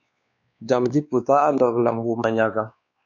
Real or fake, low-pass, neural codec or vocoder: fake; 7.2 kHz; codec, 16 kHz, 2 kbps, X-Codec, WavLM features, trained on Multilingual LibriSpeech